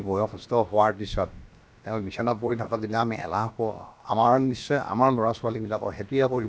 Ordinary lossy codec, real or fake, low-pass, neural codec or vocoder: none; fake; none; codec, 16 kHz, about 1 kbps, DyCAST, with the encoder's durations